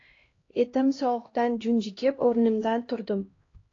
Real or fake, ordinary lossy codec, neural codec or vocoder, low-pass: fake; AAC, 32 kbps; codec, 16 kHz, 1 kbps, X-Codec, HuBERT features, trained on LibriSpeech; 7.2 kHz